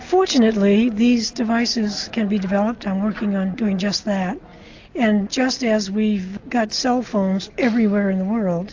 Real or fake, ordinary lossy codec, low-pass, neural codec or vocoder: real; AAC, 48 kbps; 7.2 kHz; none